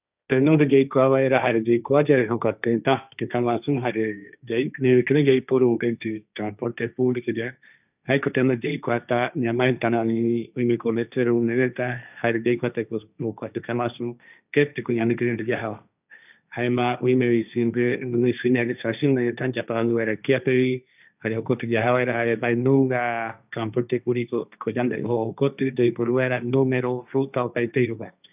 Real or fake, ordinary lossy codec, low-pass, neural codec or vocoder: fake; none; 3.6 kHz; codec, 16 kHz, 1.1 kbps, Voila-Tokenizer